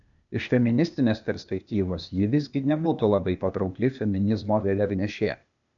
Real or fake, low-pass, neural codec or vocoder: fake; 7.2 kHz; codec, 16 kHz, 0.8 kbps, ZipCodec